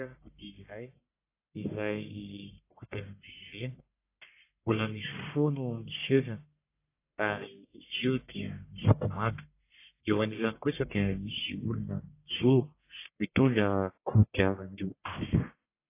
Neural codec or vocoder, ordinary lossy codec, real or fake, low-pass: codec, 44.1 kHz, 1.7 kbps, Pupu-Codec; AAC, 24 kbps; fake; 3.6 kHz